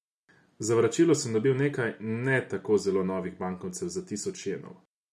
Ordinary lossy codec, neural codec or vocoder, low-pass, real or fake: none; none; none; real